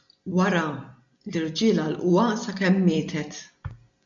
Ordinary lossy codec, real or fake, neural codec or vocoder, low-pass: MP3, 64 kbps; real; none; 7.2 kHz